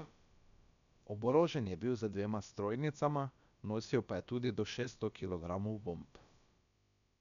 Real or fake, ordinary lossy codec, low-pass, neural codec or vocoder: fake; MP3, 96 kbps; 7.2 kHz; codec, 16 kHz, about 1 kbps, DyCAST, with the encoder's durations